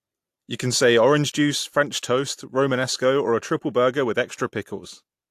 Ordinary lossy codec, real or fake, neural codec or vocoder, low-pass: AAC, 64 kbps; real; none; 14.4 kHz